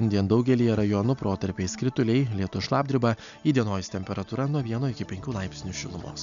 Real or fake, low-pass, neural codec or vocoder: real; 7.2 kHz; none